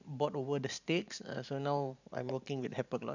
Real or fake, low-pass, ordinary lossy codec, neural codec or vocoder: fake; 7.2 kHz; none; vocoder, 44.1 kHz, 128 mel bands every 512 samples, BigVGAN v2